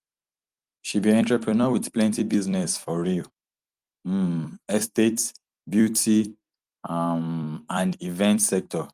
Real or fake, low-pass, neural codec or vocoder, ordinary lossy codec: fake; 14.4 kHz; vocoder, 44.1 kHz, 128 mel bands every 256 samples, BigVGAN v2; Opus, 32 kbps